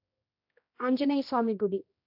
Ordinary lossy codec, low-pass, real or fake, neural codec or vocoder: AAC, 48 kbps; 5.4 kHz; fake; codec, 16 kHz, 1 kbps, X-Codec, HuBERT features, trained on general audio